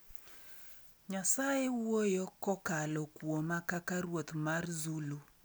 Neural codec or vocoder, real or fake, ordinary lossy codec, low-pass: none; real; none; none